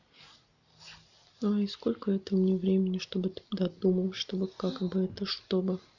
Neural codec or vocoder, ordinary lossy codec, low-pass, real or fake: none; none; 7.2 kHz; real